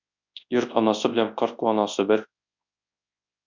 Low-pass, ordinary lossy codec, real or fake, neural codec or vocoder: 7.2 kHz; MP3, 64 kbps; fake; codec, 24 kHz, 0.9 kbps, WavTokenizer, large speech release